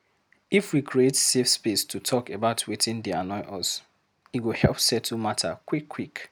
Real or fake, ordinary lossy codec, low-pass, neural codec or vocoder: real; none; none; none